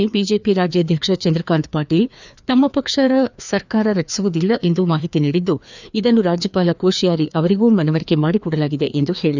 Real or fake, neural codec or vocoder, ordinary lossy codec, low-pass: fake; codec, 16 kHz, 2 kbps, FreqCodec, larger model; none; 7.2 kHz